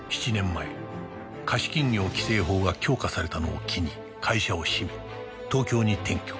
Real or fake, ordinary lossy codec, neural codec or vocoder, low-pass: real; none; none; none